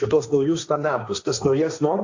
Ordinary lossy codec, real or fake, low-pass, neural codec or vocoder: AAC, 48 kbps; fake; 7.2 kHz; codec, 16 kHz, 1.1 kbps, Voila-Tokenizer